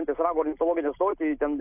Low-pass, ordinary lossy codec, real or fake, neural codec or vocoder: 3.6 kHz; AAC, 32 kbps; real; none